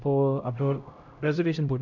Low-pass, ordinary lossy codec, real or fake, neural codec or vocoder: 7.2 kHz; none; fake; codec, 16 kHz, 0.5 kbps, X-Codec, HuBERT features, trained on LibriSpeech